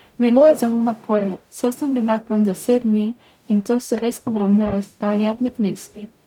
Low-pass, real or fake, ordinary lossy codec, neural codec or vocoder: 19.8 kHz; fake; none; codec, 44.1 kHz, 0.9 kbps, DAC